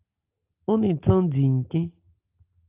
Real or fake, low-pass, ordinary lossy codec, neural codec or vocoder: real; 3.6 kHz; Opus, 32 kbps; none